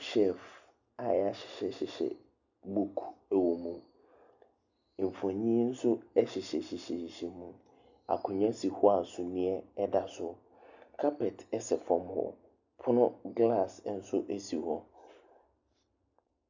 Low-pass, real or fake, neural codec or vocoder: 7.2 kHz; real; none